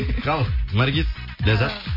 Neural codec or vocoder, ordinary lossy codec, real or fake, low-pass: none; MP3, 24 kbps; real; 5.4 kHz